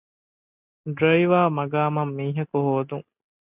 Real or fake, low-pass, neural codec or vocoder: real; 3.6 kHz; none